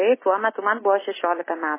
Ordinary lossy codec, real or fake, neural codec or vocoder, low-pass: MP3, 16 kbps; real; none; 3.6 kHz